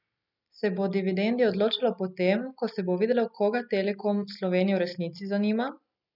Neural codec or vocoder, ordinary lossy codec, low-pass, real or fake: none; none; 5.4 kHz; real